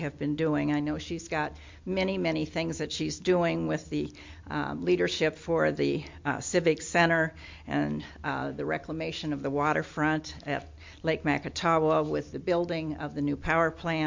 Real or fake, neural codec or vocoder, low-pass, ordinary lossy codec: real; none; 7.2 kHz; MP3, 48 kbps